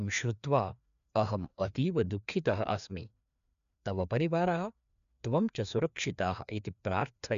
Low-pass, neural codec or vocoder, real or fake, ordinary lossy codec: 7.2 kHz; codec, 16 kHz, 2 kbps, FreqCodec, larger model; fake; MP3, 96 kbps